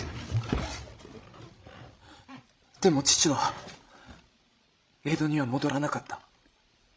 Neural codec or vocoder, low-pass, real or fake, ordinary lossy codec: codec, 16 kHz, 16 kbps, FreqCodec, larger model; none; fake; none